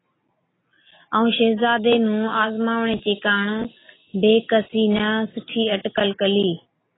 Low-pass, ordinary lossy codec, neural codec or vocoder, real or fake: 7.2 kHz; AAC, 16 kbps; none; real